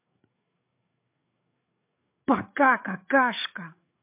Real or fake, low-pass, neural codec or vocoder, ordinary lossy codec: fake; 3.6 kHz; codec, 16 kHz, 8 kbps, FreqCodec, larger model; MP3, 32 kbps